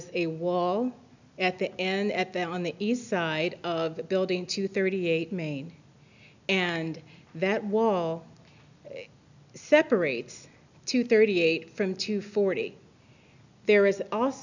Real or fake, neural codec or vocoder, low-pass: real; none; 7.2 kHz